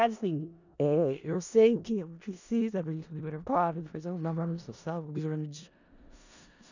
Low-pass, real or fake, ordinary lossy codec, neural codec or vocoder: 7.2 kHz; fake; none; codec, 16 kHz in and 24 kHz out, 0.4 kbps, LongCat-Audio-Codec, four codebook decoder